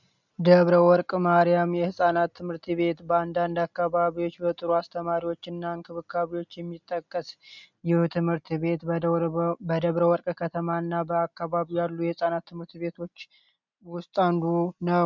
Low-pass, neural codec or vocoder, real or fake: 7.2 kHz; none; real